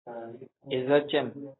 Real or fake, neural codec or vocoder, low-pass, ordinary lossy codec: real; none; 7.2 kHz; AAC, 16 kbps